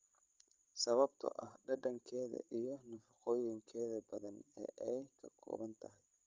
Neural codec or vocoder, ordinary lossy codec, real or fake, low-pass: codec, 16 kHz, 16 kbps, FreqCodec, larger model; Opus, 24 kbps; fake; 7.2 kHz